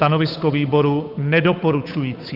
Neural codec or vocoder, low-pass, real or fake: codec, 16 kHz, 8 kbps, FunCodec, trained on Chinese and English, 25 frames a second; 5.4 kHz; fake